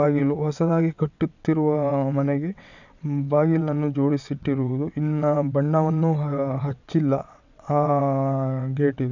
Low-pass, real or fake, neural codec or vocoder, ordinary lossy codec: 7.2 kHz; fake; vocoder, 22.05 kHz, 80 mel bands, WaveNeXt; none